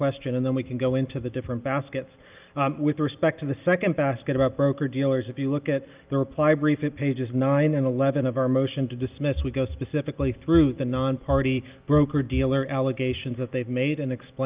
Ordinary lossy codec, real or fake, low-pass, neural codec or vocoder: Opus, 24 kbps; real; 3.6 kHz; none